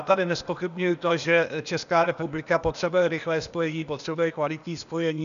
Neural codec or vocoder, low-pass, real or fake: codec, 16 kHz, 0.8 kbps, ZipCodec; 7.2 kHz; fake